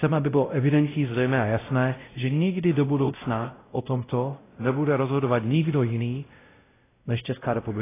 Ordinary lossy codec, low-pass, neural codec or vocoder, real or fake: AAC, 16 kbps; 3.6 kHz; codec, 16 kHz, 0.5 kbps, X-Codec, WavLM features, trained on Multilingual LibriSpeech; fake